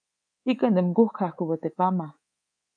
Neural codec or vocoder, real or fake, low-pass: codec, 24 kHz, 3.1 kbps, DualCodec; fake; 9.9 kHz